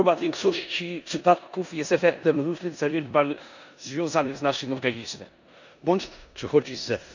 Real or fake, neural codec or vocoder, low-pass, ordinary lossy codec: fake; codec, 16 kHz in and 24 kHz out, 0.9 kbps, LongCat-Audio-Codec, four codebook decoder; 7.2 kHz; none